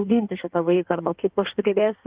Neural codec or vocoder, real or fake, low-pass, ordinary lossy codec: codec, 16 kHz in and 24 kHz out, 1.1 kbps, FireRedTTS-2 codec; fake; 3.6 kHz; Opus, 32 kbps